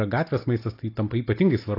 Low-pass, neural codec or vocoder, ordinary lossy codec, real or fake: 5.4 kHz; none; AAC, 32 kbps; real